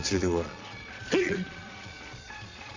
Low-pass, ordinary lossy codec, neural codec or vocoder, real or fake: 7.2 kHz; MP3, 48 kbps; vocoder, 22.05 kHz, 80 mel bands, Vocos; fake